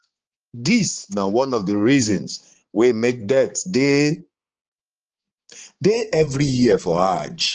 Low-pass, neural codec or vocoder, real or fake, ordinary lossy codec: 7.2 kHz; codec, 16 kHz, 4 kbps, X-Codec, HuBERT features, trained on balanced general audio; fake; Opus, 16 kbps